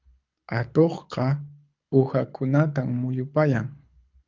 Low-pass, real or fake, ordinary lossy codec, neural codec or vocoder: 7.2 kHz; fake; Opus, 24 kbps; codec, 24 kHz, 6 kbps, HILCodec